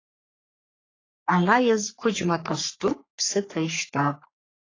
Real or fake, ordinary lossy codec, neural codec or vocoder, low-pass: fake; AAC, 32 kbps; codec, 44.1 kHz, 3.4 kbps, Pupu-Codec; 7.2 kHz